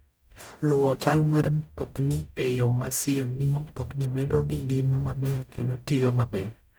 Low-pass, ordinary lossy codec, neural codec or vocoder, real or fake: none; none; codec, 44.1 kHz, 0.9 kbps, DAC; fake